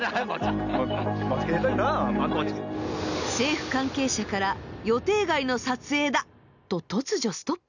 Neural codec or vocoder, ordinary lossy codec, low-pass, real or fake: none; none; 7.2 kHz; real